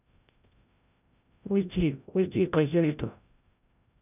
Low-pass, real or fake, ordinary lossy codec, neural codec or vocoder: 3.6 kHz; fake; none; codec, 16 kHz, 0.5 kbps, FreqCodec, larger model